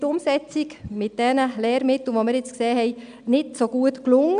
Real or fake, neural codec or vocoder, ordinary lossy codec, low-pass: real; none; none; 9.9 kHz